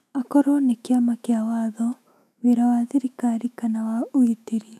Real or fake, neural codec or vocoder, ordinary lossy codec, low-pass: fake; autoencoder, 48 kHz, 128 numbers a frame, DAC-VAE, trained on Japanese speech; none; 14.4 kHz